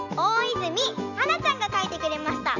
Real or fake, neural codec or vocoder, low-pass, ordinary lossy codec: real; none; 7.2 kHz; none